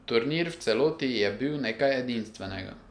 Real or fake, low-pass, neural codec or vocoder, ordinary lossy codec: real; 9.9 kHz; none; none